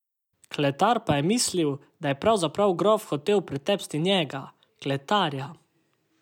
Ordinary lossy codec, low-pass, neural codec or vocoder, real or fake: none; 19.8 kHz; none; real